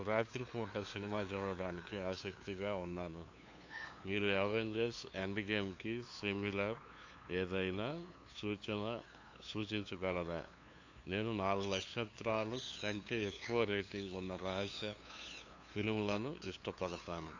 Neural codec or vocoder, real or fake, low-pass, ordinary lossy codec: codec, 16 kHz, 2 kbps, FunCodec, trained on LibriTTS, 25 frames a second; fake; 7.2 kHz; AAC, 48 kbps